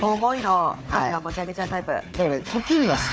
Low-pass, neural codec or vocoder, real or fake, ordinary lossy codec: none; codec, 16 kHz, 4 kbps, FunCodec, trained on Chinese and English, 50 frames a second; fake; none